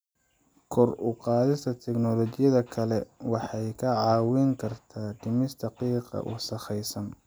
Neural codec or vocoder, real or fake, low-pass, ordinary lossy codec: none; real; none; none